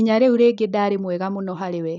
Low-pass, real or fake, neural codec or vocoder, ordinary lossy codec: 7.2 kHz; real; none; none